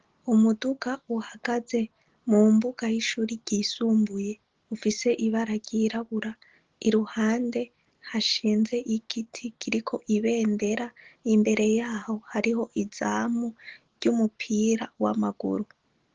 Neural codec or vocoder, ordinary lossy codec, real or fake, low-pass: none; Opus, 16 kbps; real; 7.2 kHz